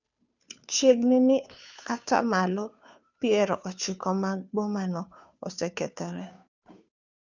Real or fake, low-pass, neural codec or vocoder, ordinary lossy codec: fake; 7.2 kHz; codec, 16 kHz, 2 kbps, FunCodec, trained on Chinese and English, 25 frames a second; none